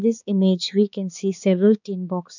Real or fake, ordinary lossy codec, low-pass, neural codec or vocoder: fake; none; 7.2 kHz; codec, 16 kHz, 4 kbps, X-Codec, HuBERT features, trained on balanced general audio